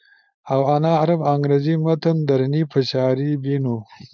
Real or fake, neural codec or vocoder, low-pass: fake; codec, 16 kHz, 4.8 kbps, FACodec; 7.2 kHz